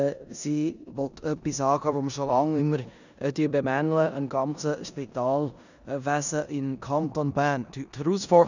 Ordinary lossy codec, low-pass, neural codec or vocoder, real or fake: none; 7.2 kHz; codec, 16 kHz in and 24 kHz out, 0.9 kbps, LongCat-Audio-Codec, four codebook decoder; fake